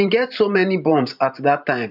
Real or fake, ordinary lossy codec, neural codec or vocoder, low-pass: fake; none; vocoder, 44.1 kHz, 128 mel bands every 512 samples, BigVGAN v2; 5.4 kHz